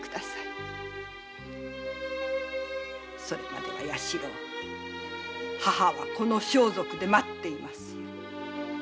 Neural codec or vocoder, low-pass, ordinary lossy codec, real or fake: none; none; none; real